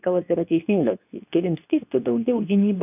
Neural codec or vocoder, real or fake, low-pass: codec, 24 kHz, 0.9 kbps, WavTokenizer, medium speech release version 2; fake; 3.6 kHz